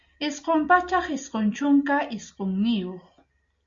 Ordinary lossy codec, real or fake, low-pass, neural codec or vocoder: Opus, 64 kbps; real; 7.2 kHz; none